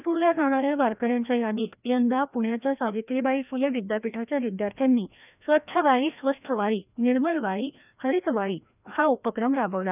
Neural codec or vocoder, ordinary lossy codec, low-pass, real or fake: codec, 16 kHz, 1 kbps, FreqCodec, larger model; none; 3.6 kHz; fake